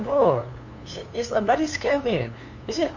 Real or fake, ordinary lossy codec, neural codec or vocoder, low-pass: fake; none; codec, 16 kHz, 2 kbps, FunCodec, trained on LibriTTS, 25 frames a second; 7.2 kHz